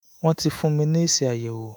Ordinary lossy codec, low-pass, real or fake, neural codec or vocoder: none; none; fake; autoencoder, 48 kHz, 128 numbers a frame, DAC-VAE, trained on Japanese speech